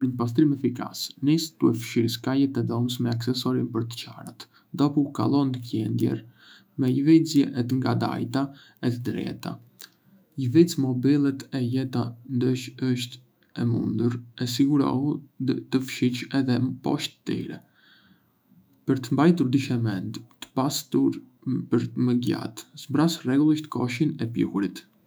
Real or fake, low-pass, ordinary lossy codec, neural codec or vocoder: fake; none; none; autoencoder, 48 kHz, 128 numbers a frame, DAC-VAE, trained on Japanese speech